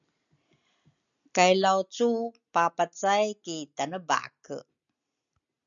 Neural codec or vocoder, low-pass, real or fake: none; 7.2 kHz; real